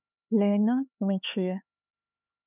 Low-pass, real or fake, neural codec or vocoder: 3.6 kHz; fake; codec, 16 kHz, 4 kbps, X-Codec, HuBERT features, trained on LibriSpeech